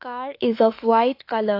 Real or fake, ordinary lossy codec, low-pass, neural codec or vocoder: fake; AAC, 32 kbps; 5.4 kHz; autoencoder, 48 kHz, 32 numbers a frame, DAC-VAE, trained on Japanese speech